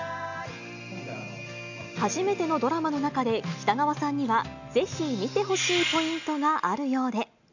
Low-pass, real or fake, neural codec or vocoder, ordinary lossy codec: 7.2 kHz; real; none; none